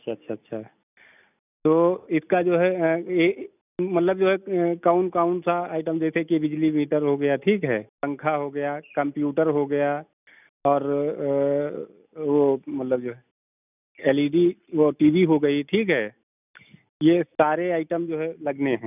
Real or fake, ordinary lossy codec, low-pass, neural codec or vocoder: real; none; 3.6 kHz; none